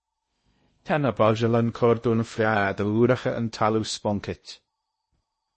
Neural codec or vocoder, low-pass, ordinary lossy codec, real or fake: codec, 16 kHz in and 24 kHz out, 0.8 kbps, FocalCodec, streaming, 65536 codes; 10.8 kHz; MP3, 32 kbps; fake